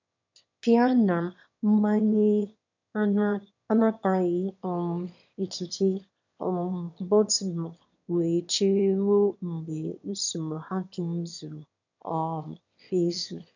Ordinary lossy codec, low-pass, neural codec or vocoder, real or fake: none; 7.2 kHz; autoencoder, 22.05 kHz, a latent of 192 numbers a frame, VITS, trained on one speaker; fake